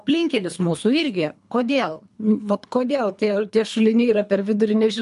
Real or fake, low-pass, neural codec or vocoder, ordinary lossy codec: fake; 10.8 kHz; codec, 24 kHz, 3 kbps, HILCodec; MP3, 64 kbps